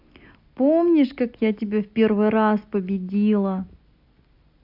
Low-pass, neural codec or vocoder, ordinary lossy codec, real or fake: 5.4 kHz; none; AAC, 48 kbps; real